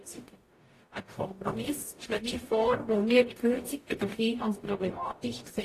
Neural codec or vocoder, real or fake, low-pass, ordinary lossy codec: codec, 44.1 kHz, 0.9 kbps, DAC; fake; 14.4 kHz; AAC, 48 kbps